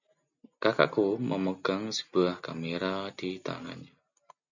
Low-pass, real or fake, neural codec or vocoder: 7.2 kHz; real; none